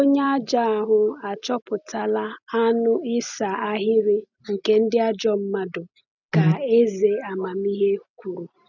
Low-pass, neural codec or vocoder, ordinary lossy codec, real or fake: 7.2 kHz; none; none; real